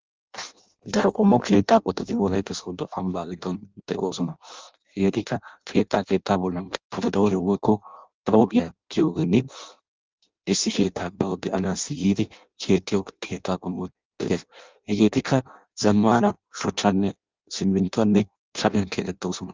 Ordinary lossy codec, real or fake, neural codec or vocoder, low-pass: Opus, 24 kbps; fake; codec, 16 kHz in and 24 kHz out, 0.6 kbps, FireRedTTS-2 codec; 7.2 kHz